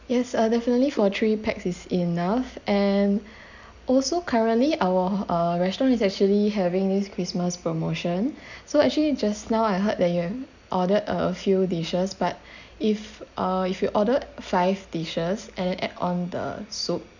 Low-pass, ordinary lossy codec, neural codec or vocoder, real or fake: 7.2 kHz; none; none; real